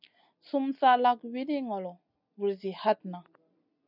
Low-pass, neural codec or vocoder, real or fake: 5.4 kHz; none; real